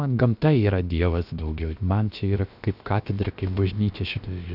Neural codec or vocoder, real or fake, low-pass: codec, 16 kHz, about 1 kbps, DyCAST, with the encoder's durations; fake; 5.4 kHz